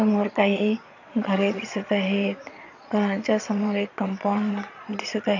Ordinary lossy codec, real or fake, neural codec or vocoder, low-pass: none; fake; vocoder, 22.05 kHz, 80 mel bands, Vocos; 7.2 kHz